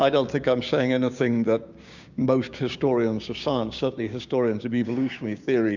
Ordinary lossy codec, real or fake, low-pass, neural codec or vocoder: Opus, 64 kbps; fake; 7.2 kHz; codec, 16 kHz, 6 kbps, DAC